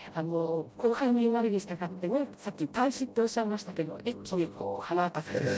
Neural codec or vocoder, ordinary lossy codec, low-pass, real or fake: codec, 16 kHz, 0.5 kbps, FreqCodec, smaller model; none; none; fake